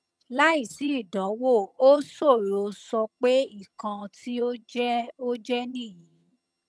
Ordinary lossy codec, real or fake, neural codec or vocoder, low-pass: none; fake; vocoder, 22.05 kHz, 80 mel bands, HiFi-GAN; none